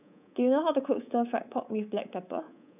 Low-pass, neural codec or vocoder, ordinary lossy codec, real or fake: 3.6 kHz; codec, 24 kHz, 3.1 kbps, DualCodec; none; fake